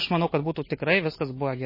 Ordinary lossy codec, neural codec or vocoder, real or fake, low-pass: MP3, 24 kbps; none; real; 5.4 kHz